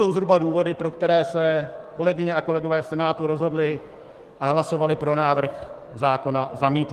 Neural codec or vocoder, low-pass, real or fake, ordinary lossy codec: codec, 44.1 kHz, 2.6 kbps, SNAC; 14.4 kHz; fake; Opus, 24 kbps